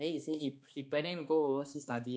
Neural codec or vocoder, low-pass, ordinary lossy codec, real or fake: codec, 16 kHz, 2 kbps, X-Codec, HuBERT features, trained on balanced general audio; none; none; fake